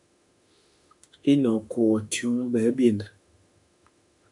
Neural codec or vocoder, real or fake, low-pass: autoencoder, 48 kHz, 32 numbers a frame, DAC-VAE, trained on Japanese speech; fake; 10.8 kHz